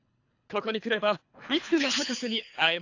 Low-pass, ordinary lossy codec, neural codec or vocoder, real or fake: 7.2 kHz; AAC, 48 kbps; codec, 24 kHz, 3 kbps, HILCodec; fake